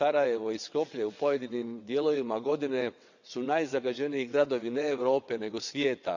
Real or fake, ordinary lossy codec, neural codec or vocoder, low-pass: fake; none; vocoder, 22.05 kHz, 80 mel bands, WaveNeXt; 7.2 kHz